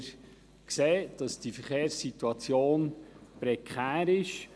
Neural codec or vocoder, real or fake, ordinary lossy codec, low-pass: none; real; none; none